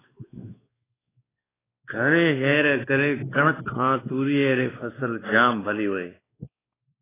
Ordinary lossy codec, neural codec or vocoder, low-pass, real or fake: AAC, 16 kbps; codec, 16 kHz, 2 kbps, X-Codec, WavLM features, trained on Multilingual LibriSpeech; 3.6 kHz; fake